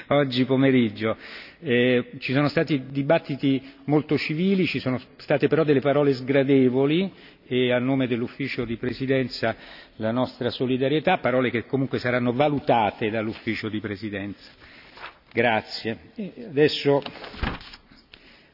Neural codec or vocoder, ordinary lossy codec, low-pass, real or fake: none; none; 5.4 kHz; real